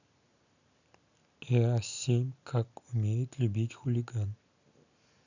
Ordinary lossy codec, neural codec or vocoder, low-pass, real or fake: none; none; 7.2 kHz; real